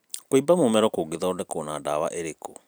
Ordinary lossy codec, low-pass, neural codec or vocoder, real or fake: none; none; vocoder, 44.1 kHz, 128 mel bands every 256 samples, BigVGAN v2; fake